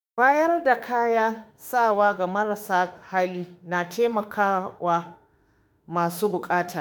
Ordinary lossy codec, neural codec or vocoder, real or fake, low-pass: none; autoencoder, 48 kHz, 32 numbers a frame, DAC-VAE, trained on Japanese speech; fake; none